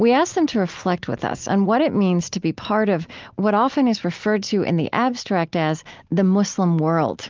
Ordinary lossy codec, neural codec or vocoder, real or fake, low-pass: Opus, 32 kbps; none; real; 7.2 kHz